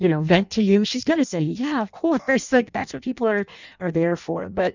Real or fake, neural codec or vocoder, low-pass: fake; codec, 16 kHz in and 24 kHz out, 0.6 kbps, FireRedTTS-2 codec; 7.2 kHz